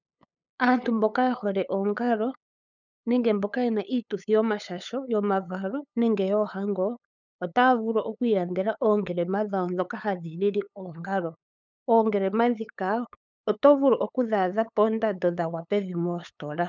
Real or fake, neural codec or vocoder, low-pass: fake; codec, 16 kHz, 8 kbps, FunCodec, trained on LibriTTS, 25 frames a second; 7.2 kHz